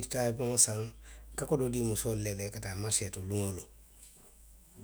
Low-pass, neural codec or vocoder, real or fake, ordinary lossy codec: none; autoencoder, 48 kHz, 128 numbers a frame, DAC-VAE, trained on Japanese speech; fake; none